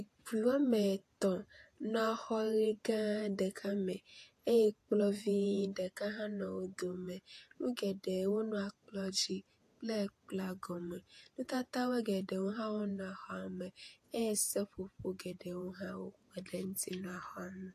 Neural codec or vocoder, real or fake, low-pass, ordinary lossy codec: vocoder, 48 kHz, 128 mel bands, Vocos; fake; 14.4 kHz; AAC, 64 kbps